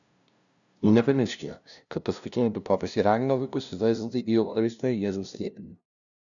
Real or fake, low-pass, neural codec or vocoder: fake; 7.2 kHz; codec, 16 kHz, 0.5 kbps, FunCodec, trained on LibriTTS, 25 frames a second